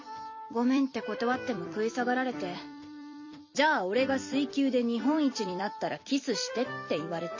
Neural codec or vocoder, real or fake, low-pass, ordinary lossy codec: vocoder, 44.1 kHz, 128 mel bands every 256 samples, BigVGAN v2; fake; 7.2 kHz; MP3, 32 kbps